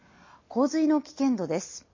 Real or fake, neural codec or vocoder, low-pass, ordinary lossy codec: real; none; 7.2 kHz; MP3, 32 kbps